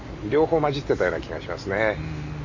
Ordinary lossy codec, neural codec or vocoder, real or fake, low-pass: AAC, 48 kbps; none; real; 7.2 kHz